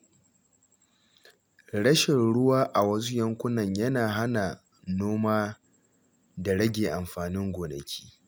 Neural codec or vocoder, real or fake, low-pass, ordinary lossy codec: none; real; none; none